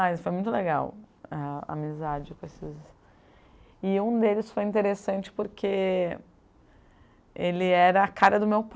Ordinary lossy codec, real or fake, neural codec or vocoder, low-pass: none; real; none; none